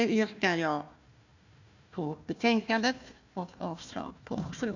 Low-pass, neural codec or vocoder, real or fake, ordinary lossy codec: 7.2 kHz; codec, 16 kHz, 1 kbps, FunCodec, trained on Chinese and English, 50 frames a second; fake; none